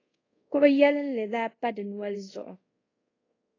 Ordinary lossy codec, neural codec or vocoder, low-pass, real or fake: AAC, 32 kbps; codec, 24 kHz, 0.5 kbps, DualCodec; 7.2 kHz; fake